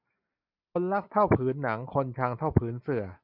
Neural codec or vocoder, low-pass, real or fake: none; 5.4 kHz; real